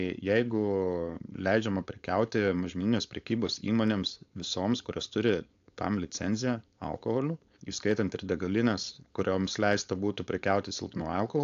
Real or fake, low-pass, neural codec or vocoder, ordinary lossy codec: fake; 7.2 kHz; codec, 16 kHz, 4.8 kbps, FACodec; AAC, 64 kbps